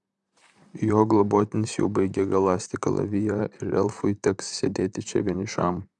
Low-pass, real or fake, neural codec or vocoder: 10.8 kHz; fake; vocoder, 44.1 kHz, 128 mel bands, Pupu-Vocoder